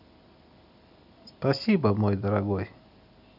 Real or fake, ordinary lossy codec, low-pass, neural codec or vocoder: real; none; 5.4 kHz; none